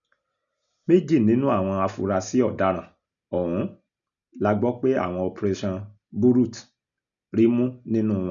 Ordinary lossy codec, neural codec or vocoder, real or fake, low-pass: none; none; real; 7.2 kHz